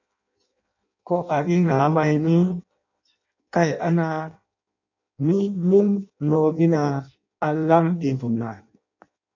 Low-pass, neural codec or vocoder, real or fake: 7.2 kHz; codec, 16 kHz in and 24 kHz out, 0.6 kbps, FireRedTTS-2 codec; fake